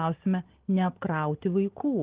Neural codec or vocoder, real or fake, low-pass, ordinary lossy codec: none; real; 3.6 kHz; Opus, 16 kbps